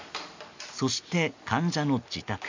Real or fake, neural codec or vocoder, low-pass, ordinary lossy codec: real; none; 7.2 kHz; MP3, 64 kbps